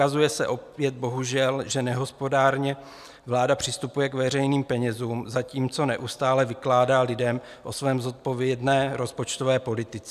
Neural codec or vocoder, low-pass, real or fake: none; 14.4 kHz; real